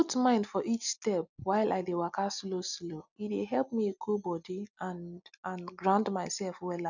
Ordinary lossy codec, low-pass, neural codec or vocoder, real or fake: none; 7.2 kHz; none; real